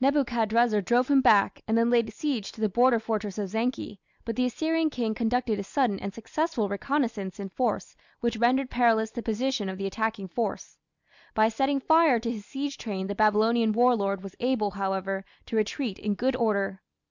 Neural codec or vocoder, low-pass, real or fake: none; 7.2 kHz; real